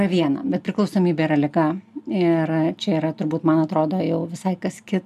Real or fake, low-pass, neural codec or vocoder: real; 14.4 kHz; none